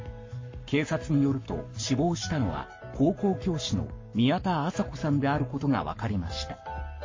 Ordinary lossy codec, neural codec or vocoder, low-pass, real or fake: MP3, 32 kbps; codec, 44.1 kHz, 7.8 kbps, Pupu-Codec; 7.2 kHz; fake